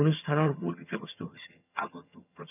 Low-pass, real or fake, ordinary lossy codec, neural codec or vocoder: 3.6 kHz; fake; none; vocoder, 22.05 kHz, 80 mel bands, HiFi-GAN